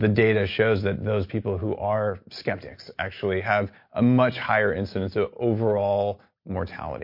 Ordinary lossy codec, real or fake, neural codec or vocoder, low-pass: MP3, 32 kbps; real; none; 5.4 kHz